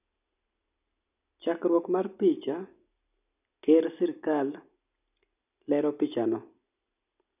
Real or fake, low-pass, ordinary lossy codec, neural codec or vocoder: real; 3.6 kHz; none; none